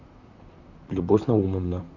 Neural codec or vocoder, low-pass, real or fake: vocoder, 44.1 kHz, 128 mel bands, Pupu-Vocoder; 7.2 kHz; fake